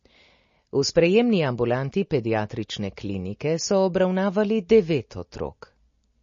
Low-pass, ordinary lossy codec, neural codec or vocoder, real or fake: 7.2 kHz; MP3, 32 kbps; none; real